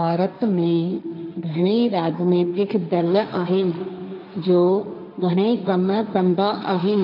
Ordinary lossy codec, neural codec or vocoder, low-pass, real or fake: none; codec, 16 kHz, 1.1 kbps, Voila-Tokenizer; 5.4 kHz; fake